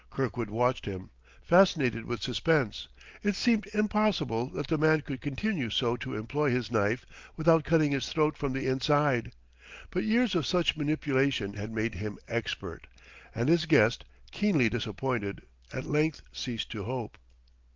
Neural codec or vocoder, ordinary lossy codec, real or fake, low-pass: none; Opus, 32 kbps; real; 7.2 kHz